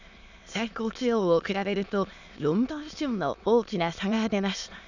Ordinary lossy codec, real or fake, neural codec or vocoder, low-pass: none; fake; autoencoder, 22.05 kHz, a latent of 192 numbers a frame, VITS, trained on many speakers; 7.2 kHz